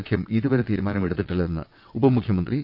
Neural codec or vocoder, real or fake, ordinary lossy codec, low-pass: vocoder, 22.05 kHz, 80 mel bands, WaveNeXt; fake; none; 5.4 kHz